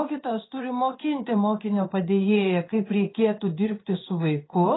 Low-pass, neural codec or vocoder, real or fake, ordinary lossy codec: 7.2 kHz; codec, 16 kHz in and 24 kHz out, 1 kbps, XY-Tokenizer; fake; AAC, 16 kbps